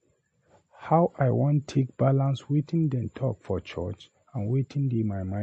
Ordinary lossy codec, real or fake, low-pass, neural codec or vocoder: MP3, 32 kbps; real; 10.8 kHz; none